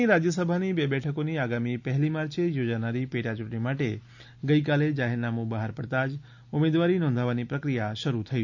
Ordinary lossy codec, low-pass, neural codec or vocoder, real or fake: none; 7.2 kHz; none; real